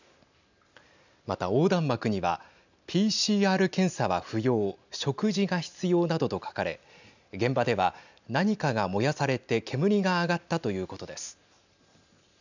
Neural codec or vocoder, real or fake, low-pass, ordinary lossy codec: none; real; 7.2 kHz; none